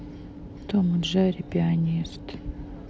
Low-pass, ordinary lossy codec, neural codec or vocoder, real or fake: none; none; none; real